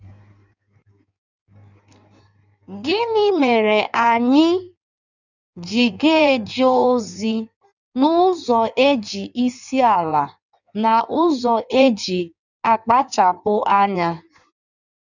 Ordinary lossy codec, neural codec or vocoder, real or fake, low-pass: none; codec, 16 kHz in and 24 kHz out, 1.1 kbps, FireRedTTS-2 codec; fake; 7.2 kHz